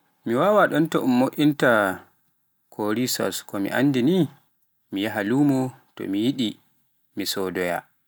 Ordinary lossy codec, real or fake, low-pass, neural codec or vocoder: none; real; none; none